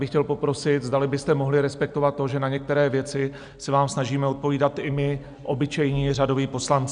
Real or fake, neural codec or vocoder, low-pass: real; none; 9.9 kHz